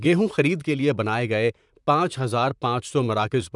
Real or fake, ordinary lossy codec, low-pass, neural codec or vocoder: fake; none; 10.8 kHz; vocoder, 44.1 kHz, 128 mel bands, Pupu-Vocoder